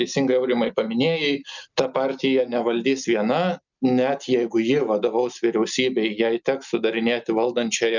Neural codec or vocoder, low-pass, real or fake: none; 7.2 kHz; real